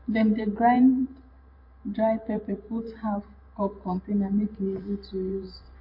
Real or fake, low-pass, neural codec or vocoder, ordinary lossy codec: fake; 5.4 kHz; vocoder, 44.1 kHz, 128 mel bands every 512 samples, BigVGAN v2; MP3, 32 kbps